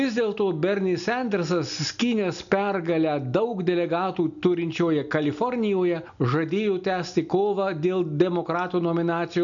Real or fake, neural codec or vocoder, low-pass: real; none; 7.2 kHz